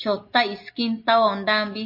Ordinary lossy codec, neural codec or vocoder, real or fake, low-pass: MP3, 32 kbps; none; real; 5.4 kHz